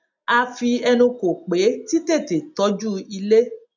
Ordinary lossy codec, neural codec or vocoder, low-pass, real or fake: none; none; 7.2 kHz; real